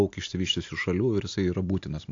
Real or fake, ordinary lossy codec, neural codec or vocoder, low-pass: real; AAC, 48 kbps; none; 7.2 kHz